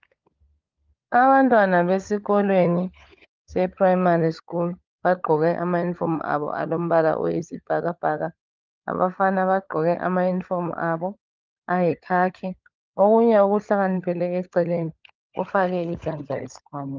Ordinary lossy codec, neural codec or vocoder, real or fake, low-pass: Opus, 24 kbps; codec, 16 kHz, 16 kbps, FunCodec, trained on LibriTTS, 50 frames a second; fake; 7.2 kHz